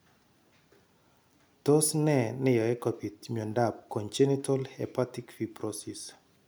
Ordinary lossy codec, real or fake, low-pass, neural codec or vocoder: none; real; none; none